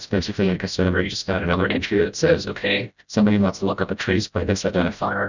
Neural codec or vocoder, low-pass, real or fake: codec, 16 kHz, 0.5 kbps, FreqCodec, smaller model; 7.2 kHz; fake